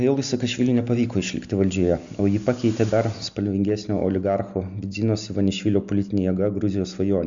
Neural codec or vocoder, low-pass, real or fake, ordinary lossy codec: none; 7.2 kHz; real; Opus, 64 kbps